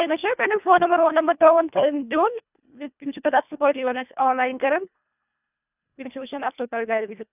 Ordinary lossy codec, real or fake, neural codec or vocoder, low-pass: none; fake; codec, 24 kHz, 1.5 kbps, HILCodec; 3.6 kHz